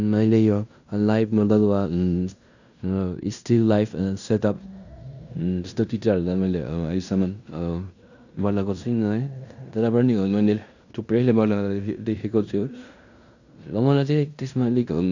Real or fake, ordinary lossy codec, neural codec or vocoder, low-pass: fake; none; codec, 16 kHz in and 24 kHz out, 0.9 kbps, LongCat-Audio-Codec, fine tuned four codebook decoder; 7.2 kHz